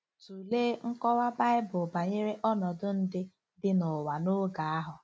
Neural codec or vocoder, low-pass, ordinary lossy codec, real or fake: none; none; none; real